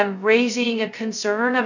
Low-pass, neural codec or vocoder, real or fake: 7.2 kHz; codec, 16 kHz, 0.2 kbps, FocalCodec; fake